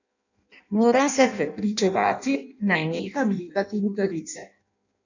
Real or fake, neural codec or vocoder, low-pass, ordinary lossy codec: fake; codec, 16 kHz in and 24 kHz out, 0.6 kbps, FireRedTTS-2 codec; 7.2 kHz; none